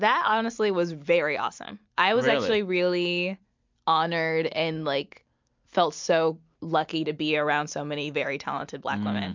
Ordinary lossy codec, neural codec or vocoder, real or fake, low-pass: MP3, 64 kbps; none; real; 7.2 kHz